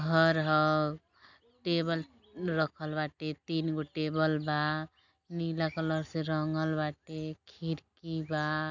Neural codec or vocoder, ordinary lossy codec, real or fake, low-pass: none; none; real; 7.2 kHz